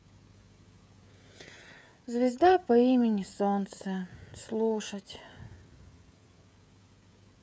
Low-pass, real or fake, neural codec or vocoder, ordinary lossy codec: none; fake; codec, 16 kHz, 16 kbps, FreqCodec, smaller model; none